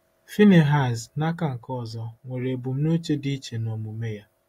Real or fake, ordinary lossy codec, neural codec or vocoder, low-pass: real; AAC, 48 kbps; none; 19.8 kHz